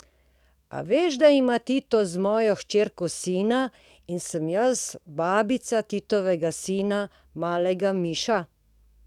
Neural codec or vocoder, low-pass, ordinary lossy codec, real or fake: codec, 44.1 kHz, 7.8 kbps, DAC; 19.8 kHz; none; fake